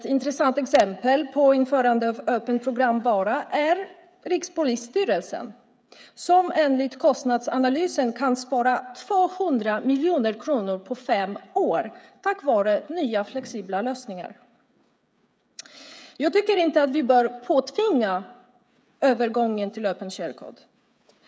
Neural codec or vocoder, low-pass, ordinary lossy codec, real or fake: codec, 16 kHz, 16 kbps, FreqCodec, smaller model; none; none; fake